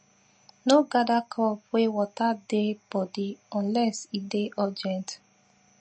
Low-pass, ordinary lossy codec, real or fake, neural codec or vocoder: 10.8 kHz; MP3, 32 kbps; real; none